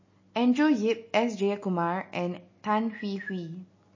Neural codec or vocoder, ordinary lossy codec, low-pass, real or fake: none; MP3, 32 kbps; 7.2 kHz; real